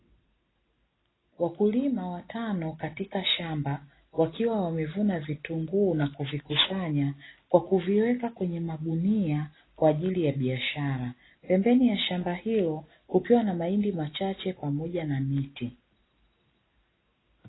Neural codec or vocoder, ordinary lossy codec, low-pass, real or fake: none; AAC, 16 kbps; 7.2 kHz; real